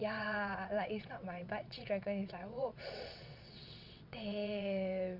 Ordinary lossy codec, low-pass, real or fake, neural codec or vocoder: MP3, 48 kbps; 5.4 kHz; fake; vocoder, 22.05 kHz, 80 mel bands, WaveNeXt